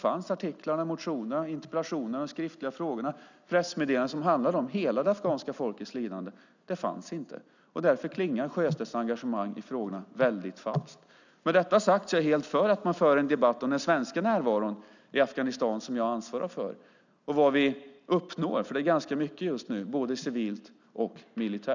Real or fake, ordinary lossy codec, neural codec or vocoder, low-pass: real; none; none; 7.2 kHz